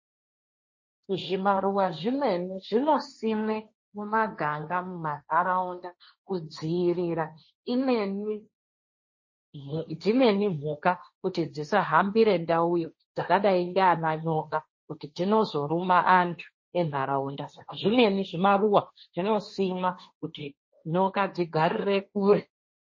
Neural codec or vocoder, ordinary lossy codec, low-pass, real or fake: codec, 16 kHz, 1.1 kbps, Voila-Tokenizer; MP3, 32 kbps; 7.2 kHz; fake